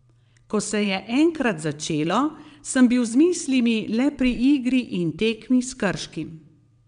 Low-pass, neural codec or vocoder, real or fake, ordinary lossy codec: 9.9 kHz; vocoder, 22.05 kHz, 80 mel bands, WaveNeXt; fake; none